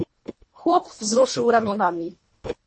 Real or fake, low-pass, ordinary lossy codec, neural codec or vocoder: fake; 9.9 kHz; MP3, 32 kbps; codec, 24 kHz, 1.5 kbps, HILCodec